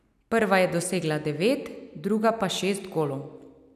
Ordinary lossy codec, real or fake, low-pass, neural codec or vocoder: none; real; 14.4 kHz; none